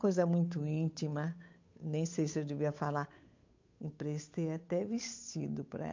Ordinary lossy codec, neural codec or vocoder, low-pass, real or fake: MP3, 48 kbps; codec, 16 kHz, 8 kbps, FunCodec, trained on Chinese and English, 25 frames a second; 7.2 kHz; fake